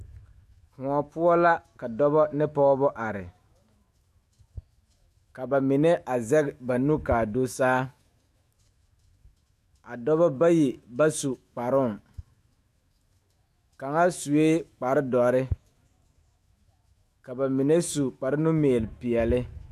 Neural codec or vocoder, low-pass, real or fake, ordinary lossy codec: autoencoder, 48 kHz, 128 numbers a frame, DAC-VAE, trained on Japanese speech; 14.4 kHz; fake; AAC, 96 kbps